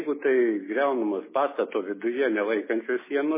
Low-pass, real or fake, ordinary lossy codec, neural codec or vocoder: 3.6 kHz; real; MP3, 16 kbps; none